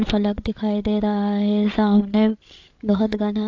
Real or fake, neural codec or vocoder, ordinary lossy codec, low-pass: fake; codec, 16 kHz, 8 kbps, FreqCodec, larger model; AAC, 48 kbps; 7.2 kHz